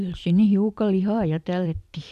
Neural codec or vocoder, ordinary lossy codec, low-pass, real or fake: vocoder, 44.1 kHz, 128 mel bands every 256 samples, BigVGAN v2; none; 14.4 kHz; fake